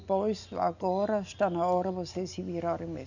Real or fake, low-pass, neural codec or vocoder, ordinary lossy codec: real; 7.2 kHz; none; none